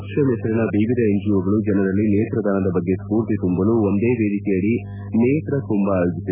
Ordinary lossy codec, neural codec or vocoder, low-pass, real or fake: none; none; 3.6 kHz; real